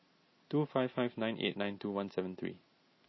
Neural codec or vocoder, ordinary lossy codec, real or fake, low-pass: none; MP3, 24 kbps; real; 7.2 kHz